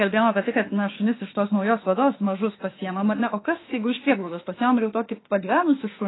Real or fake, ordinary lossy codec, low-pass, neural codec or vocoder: fake; AAC, 16 kbps; 7.2 kHz; autoencoder, 48 kHz, 32 numbers a frame, DAC-VAE, trained on Japanese speech